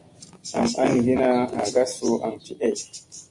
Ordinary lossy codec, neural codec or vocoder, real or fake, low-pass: Opus, 64 kbps; none; real; 10.8 kHz